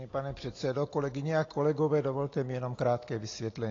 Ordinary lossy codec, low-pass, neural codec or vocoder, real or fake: AAC, 32 kbps; 7.2 kHz; none; real